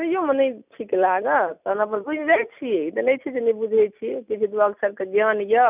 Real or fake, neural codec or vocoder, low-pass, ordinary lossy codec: real; none; 3.6 kHz; none